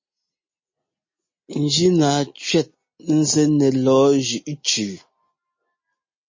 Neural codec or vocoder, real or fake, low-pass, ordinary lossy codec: none; real; 7.2 kHz; MP3, 32 kbps